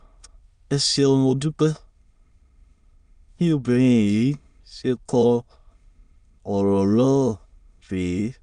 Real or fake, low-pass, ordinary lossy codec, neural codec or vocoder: fake; 9.9 kHz; Opus, 64 kbps; autoencoder, 22.05 kHz, a latent of 192 numbers a frame, VITS, trained on many speakers